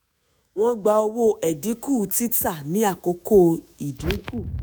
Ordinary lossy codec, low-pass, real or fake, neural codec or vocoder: none; none; fake; autoencoder, 48 kHz, 128 numbers a frame, DAC-VAE, trained on Japanese speech